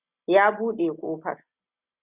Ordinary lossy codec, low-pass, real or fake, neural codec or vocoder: Opus, 64 kbps; 3.6 kHz; real; none